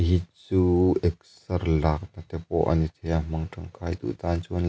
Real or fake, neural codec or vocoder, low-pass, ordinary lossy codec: real; none; none; none